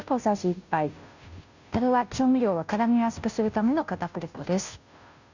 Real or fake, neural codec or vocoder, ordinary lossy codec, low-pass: fake; codec, 16 kHz, 0.5 kbps, FunCodec, trained on Chinese and English, 25 frames a second; none; 7.2 kHz